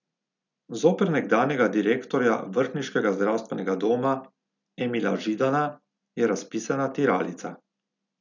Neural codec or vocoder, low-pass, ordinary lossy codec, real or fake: none; 7.2 kHz; none; real